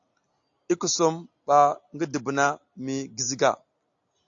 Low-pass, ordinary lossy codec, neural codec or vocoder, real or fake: 7.2 kHz; AAC, 64 kbps; none; real